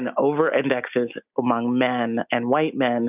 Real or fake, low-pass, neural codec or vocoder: real; 3.6 kHz; none